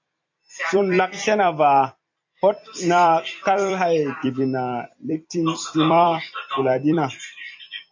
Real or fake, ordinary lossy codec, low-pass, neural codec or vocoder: fake; AAC, 48 kbps; 7.2 kHz; vocoder, 44.1 kHz, 128 mel bands every 256 samples, BigVGAN v2